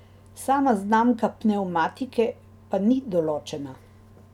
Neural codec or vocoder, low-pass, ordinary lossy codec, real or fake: none; 19.8 kHz; none; real